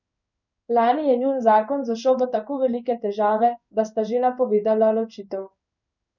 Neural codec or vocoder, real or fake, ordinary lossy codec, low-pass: codec, 16 kHz in and 24 kHz out, 1 kbps, XY-Tokenizer; fake; none; 7.2 kHz